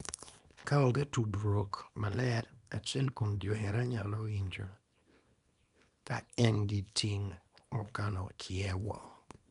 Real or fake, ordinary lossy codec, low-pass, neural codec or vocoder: fake; none; 10.8 kHz; codec, 24 kHz, 0.9 kbps, WavTokenizer, small release